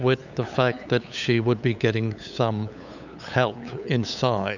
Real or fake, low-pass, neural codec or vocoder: fake; 7.2 kHz; codec, 16 kHz, 8 kbps, FunCodec, trained on LibriTTS, 25 frames a second